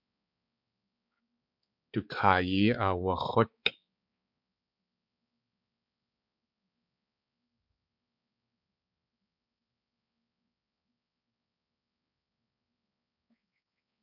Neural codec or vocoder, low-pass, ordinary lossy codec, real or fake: codec, 16 kHz, 4 kbps, X-Codec, HuBERT features, trained on balanced general audio; 5.4 kHz; MP3, 48 kbps; fake